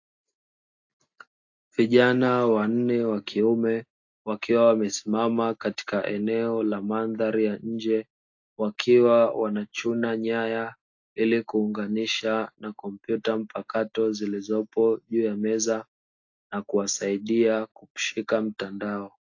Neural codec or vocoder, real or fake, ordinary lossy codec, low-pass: none; real; AAC, 48 kbps; 7.2 kHz